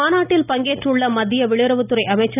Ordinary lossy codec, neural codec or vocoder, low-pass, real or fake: none; none; 3.6 kHz; real